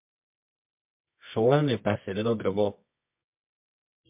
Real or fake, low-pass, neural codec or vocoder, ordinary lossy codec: fake; 3.6 kHz; codec, 24 kHz, 0.9 kbps, WavTokenizer, medium music audio release; MP3, 32 kbps